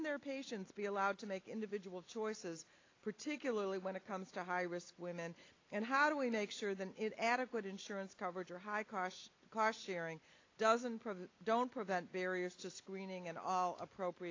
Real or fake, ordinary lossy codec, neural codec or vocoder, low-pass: real; AAC, 32 kbps; none; 7.2 kHz